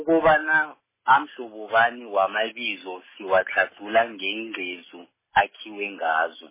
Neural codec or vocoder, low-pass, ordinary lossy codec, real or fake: none; 3.6 kHz; MP3, 16 kbps; real